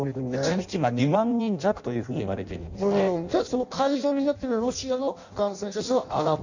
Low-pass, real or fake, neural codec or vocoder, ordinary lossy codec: 7.2 kHz; fake; codec, 16 kHz in and 24 kHz out, 0.6 kbps, FireRedTTS-2 codec; none